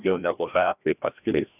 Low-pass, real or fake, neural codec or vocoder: 3.6 kHz; fake; codec, 16 kHz, 1 kbps, FreqCodec, larger model